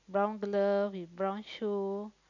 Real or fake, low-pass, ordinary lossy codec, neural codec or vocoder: real; 7.2 kHz; none; none